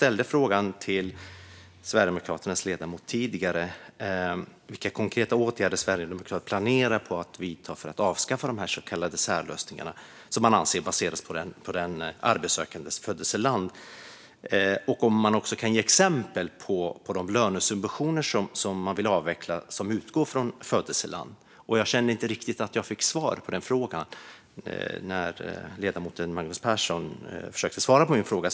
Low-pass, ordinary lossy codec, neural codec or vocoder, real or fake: none; none; none; real